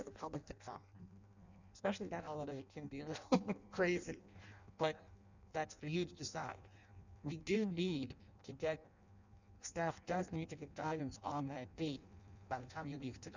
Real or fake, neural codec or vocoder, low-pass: fake; codec, 16 kHz in and 24 kHz out, 0.6 kbps, FireRedTTS-2 codec; 7.2 kHz